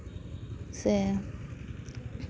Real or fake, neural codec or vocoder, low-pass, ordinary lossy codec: real; none; none; none